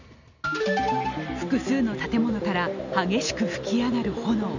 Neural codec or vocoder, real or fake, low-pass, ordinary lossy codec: none; real; 7.2 kHz; none